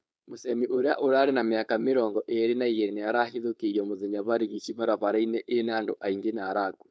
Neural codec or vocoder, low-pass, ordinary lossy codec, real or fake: codec, 16 kHz, 4.8 kbps, FACodec; none; none; fake